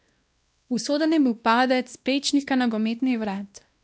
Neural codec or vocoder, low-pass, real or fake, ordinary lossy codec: codec, 16 kHz, 1 kbps, X-Codec, WavLM features, trained on Multilingual LibriSpeech; none; fake; none